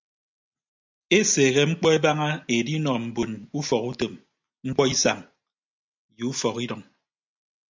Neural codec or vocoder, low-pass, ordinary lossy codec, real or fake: none; 7.2 kHz; MP3, 64 kbps; real